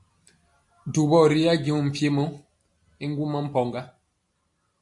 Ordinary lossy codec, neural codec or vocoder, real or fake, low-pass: AAC, 64 kbps; none; real; 10.8 kHz